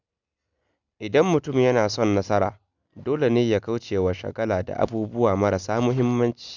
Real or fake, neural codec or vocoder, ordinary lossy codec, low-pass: real; none; none; 7.2 kHz